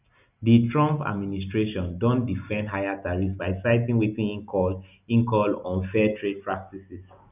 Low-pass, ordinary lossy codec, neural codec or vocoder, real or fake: 3.6 kHz; none; none; real